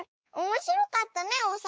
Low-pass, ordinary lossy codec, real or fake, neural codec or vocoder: none; none; fake; codec, 16 kHz, 4 kbps, X-Codec, WavLM features, trained on Multilingual LibriSpeech